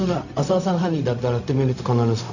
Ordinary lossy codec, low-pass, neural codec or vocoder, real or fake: none; 7.2 kHz; codec, 16 kHz, 0.4 kbps, LongCat-Audio-Codec; fake